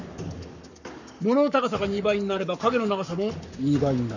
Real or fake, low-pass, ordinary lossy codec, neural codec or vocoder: fake; 7.2 kHz; none; codec, 44.1 kHz, 7.8 kbps, Pupu-Codec